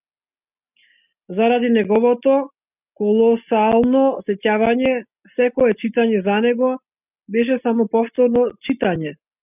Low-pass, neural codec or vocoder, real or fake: 3.6 kHz; none; real